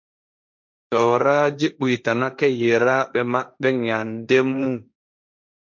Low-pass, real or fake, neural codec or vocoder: 7.2 kHz; fake; codec, 16 kHz, 1.1 kbps, Voila-Tokenizer